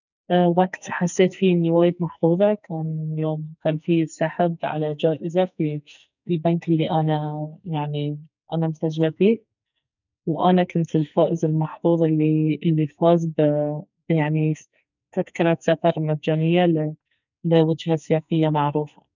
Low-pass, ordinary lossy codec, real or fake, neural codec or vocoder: 7.2 kHz; none; fake; codec, 44.1 kHz, 2.6 kbps, SNAC